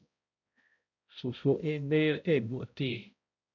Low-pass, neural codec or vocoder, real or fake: 7.2 kHz; codec, 16 kHz, 0.5 kbps, X-Codec, HuBERT features, trained on balanced general audio; fake